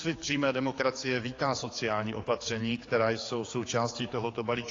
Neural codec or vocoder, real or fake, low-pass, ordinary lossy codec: codec, 16 kHz, 4 kbps, X-Codec, HuBERT features, trained on general audio; fake; 7.2 kHz; AAC, 32 kbps